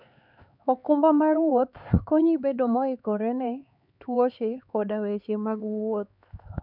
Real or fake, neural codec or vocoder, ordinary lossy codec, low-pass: fake; codec, 16 kHz, 4 kbps, X-Codec, HuBERT features, trained on LibriSpeech; none; 5.4 kHz